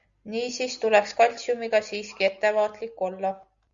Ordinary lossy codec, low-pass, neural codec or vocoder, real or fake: Opus, 64 kbps; 7.2 kHz; none; real